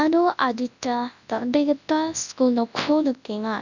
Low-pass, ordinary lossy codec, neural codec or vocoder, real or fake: 7.2 kHz; none; codec, 24 kHz, 0.9 kbps, WavTokenizer, large speech release; fake